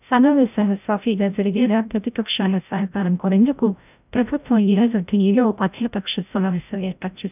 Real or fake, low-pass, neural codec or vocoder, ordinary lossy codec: fake; 3.6 kHz; codec, 16 kHz, 0.5 kbps, FreqCodec, larger model; none